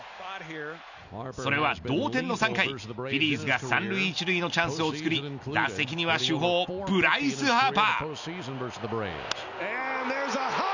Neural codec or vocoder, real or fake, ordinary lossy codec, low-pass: none; real; none; 7.2 kHz